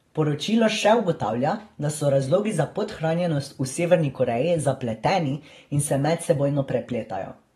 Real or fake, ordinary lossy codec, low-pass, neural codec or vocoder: fake; AAC, 32 kbps; 19.8 kHz; vocoder, 44.1 kHz, 128 mel bands every 512 samples, BigVGAN v2